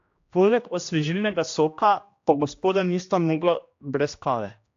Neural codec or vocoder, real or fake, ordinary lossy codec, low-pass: codec, 16 kHz, 1 kbps, X-Codec, HuBERT features, trained on general audio; fake; AAC, 48 kbps; 7.2 kHz